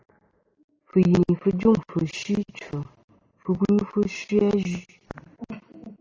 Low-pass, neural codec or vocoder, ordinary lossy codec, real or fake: 7.2 kHz; none; AAC, 32 kbps; real